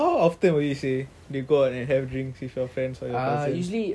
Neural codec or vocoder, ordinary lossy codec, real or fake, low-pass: none; none; real; none